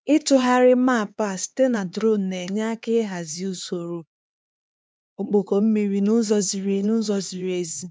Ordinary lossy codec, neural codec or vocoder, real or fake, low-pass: none; codec, 16 kHz, 4 kbps, X-Codec, HuBERT features, trained on LibriSpeech; fake; none